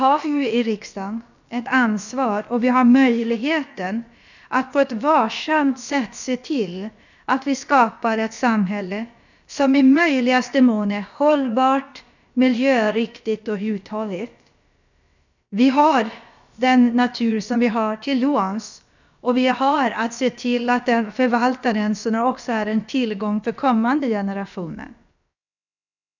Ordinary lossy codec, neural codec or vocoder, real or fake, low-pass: none; codec, 16 kHz, about 1 kbps, DyCAST, with the encoder's durations; fake; 7.2 kHz